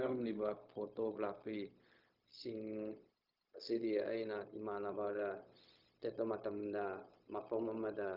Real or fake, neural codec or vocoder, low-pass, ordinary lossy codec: fake; codec, 16 kHz, 0.4 kbps, LongCat-Audio-Codec; 5.4 kHz; Opus, 24 kbps